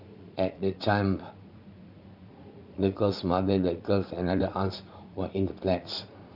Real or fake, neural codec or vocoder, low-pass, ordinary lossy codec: fake; vocoder, 44.1 kHz, 80 mel bands, Vocos; 5.4 kHz; Opus, 64 kbps